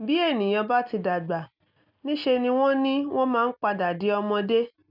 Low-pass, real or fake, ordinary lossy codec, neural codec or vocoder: 5.4 kHz; real; none; none